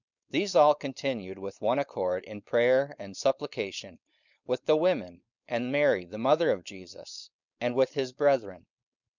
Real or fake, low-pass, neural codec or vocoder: fake; 7.2 kHz; codec, 16 kHz, 4.8 kbps, FACodec